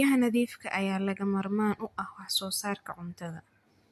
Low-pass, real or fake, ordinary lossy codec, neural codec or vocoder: 14.4 kHz; real; MP3, 64 kbps; none